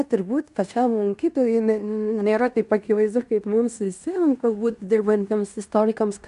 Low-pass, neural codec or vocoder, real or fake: 10.8 kHz; codec, 16 kHz in and 24 kHz out, 0.9 kbps, LongCat-Audio-Codec, fine tuned four codebook decoder; fake